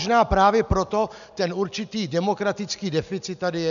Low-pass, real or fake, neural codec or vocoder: 7.2 kHz; real; none